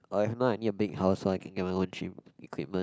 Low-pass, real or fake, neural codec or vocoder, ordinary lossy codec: none; real; none; none